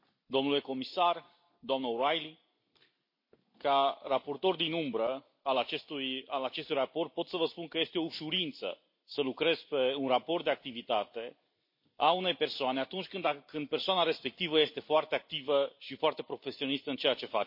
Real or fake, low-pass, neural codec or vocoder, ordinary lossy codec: real; 5.4 kHz; none; MP3, 32 kbps